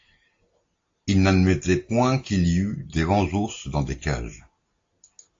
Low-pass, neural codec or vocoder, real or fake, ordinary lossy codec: 7.2 kHz; none; real; AAC, 48 kbps